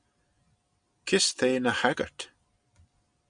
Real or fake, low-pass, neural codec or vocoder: real; 9.9 kHz; none